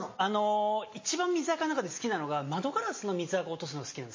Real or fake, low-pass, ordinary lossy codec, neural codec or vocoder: real; 7.2 kHz; MP3, 32 kbps; none